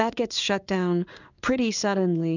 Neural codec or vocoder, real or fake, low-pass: codec, 16 kHz in and 24 kHz out, 1 kbps, XY-Tokenizer; fake; 7.2 kHz